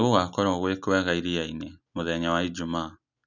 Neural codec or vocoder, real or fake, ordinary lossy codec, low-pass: none; real; none; 7.2 kHz